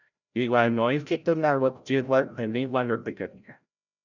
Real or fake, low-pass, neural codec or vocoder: fake; 7.2 kHz; codec, 16 kHz, 0.5 kbps, FreqCodec, larger model